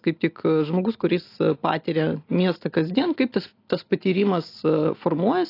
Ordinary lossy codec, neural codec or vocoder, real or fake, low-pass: AAC, 32 kbps; none; real; 5.4 kHz